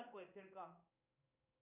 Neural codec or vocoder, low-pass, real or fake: codec, 16 kHz in and 24 kHz out, 1 kbps, XY-Tokenizer; 3.6 kHz; fake